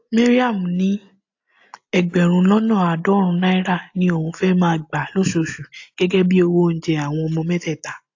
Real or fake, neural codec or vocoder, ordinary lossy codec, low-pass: real; none; AAC, 48 kbps; 7.2 kHz